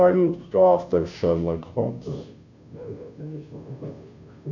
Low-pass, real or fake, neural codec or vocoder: 7.2 kHz; fake; codec, 16 kHz, 0.5 kbps, FunCodec, trained on Chinese and English, 25 frames a second